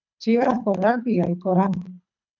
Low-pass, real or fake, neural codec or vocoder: 7.2 kHz; fake; codec, 24 kHz, 3 kbps, HILCodec